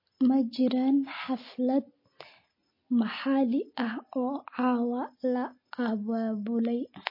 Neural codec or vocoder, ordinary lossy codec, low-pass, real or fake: none; MP3, 24 kbps; 5.4 kHz; real